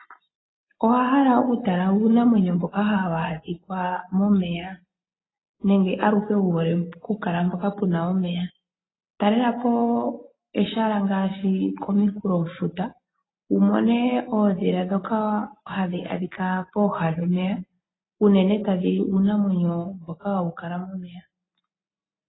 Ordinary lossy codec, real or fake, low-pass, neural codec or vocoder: AAC, 16 kbps; real; 7.2 kHz; none